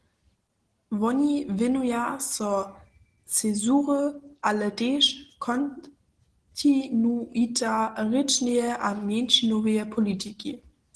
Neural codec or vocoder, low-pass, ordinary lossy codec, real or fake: none; 10.8 kHz; Opus, 16 kbps; real